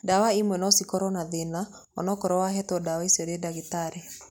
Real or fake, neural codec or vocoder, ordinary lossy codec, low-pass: real; none; none; 19.8 kHz